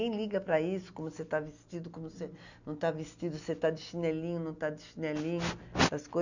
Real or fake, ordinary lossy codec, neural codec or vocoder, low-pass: real; none; none; 7.2 kHz